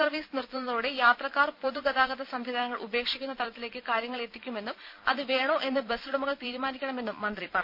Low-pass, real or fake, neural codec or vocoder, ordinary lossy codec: 5.4 kHz; fake; vocoder, 44.1 kHz, 128 mel bands every 256 samples, BigVGAN v2; none